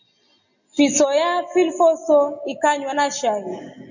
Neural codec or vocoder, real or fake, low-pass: none; real; 7.2 kHz